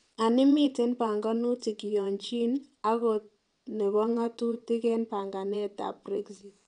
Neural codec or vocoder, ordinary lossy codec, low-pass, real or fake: vocoder, 22.05 kHz, 80 mel bands, WaveNeXt; none; 9.9 kHz; fake